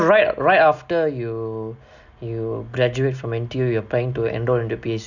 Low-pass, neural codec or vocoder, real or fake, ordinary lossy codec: 7.2 kHz; none; real; none